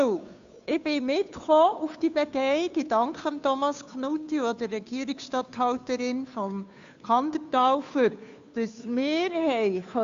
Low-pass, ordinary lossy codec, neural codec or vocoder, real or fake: 7.2 kHz; AAC, 64 kbps; codec, 16 kHz, 2 kbps, FunCodec, trained on Chinese and English, 25 frames a second; fake